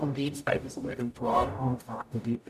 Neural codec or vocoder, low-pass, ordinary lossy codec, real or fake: codec, 44.1 kHz, 0.9 kbps, DAC; 14.4 kHz; none; fake